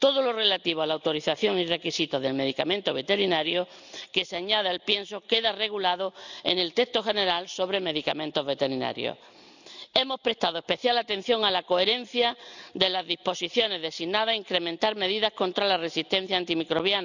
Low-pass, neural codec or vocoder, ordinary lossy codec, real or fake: 7.2 kHz; none; none; real